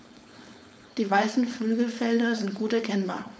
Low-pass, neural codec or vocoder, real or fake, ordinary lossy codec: none; codec, 16 kHz, 4.8 kbps, FACodec; fake; none